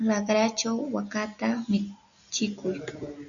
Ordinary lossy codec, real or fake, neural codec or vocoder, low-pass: MP3, 96 kbps; real; none; 7.2 kHz